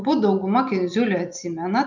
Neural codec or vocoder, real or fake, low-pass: none; real; 7.2 kHz